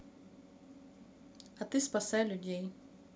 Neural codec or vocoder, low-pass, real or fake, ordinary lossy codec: none; none; real; none